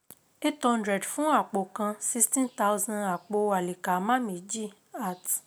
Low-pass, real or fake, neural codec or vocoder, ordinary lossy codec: none; real; none; none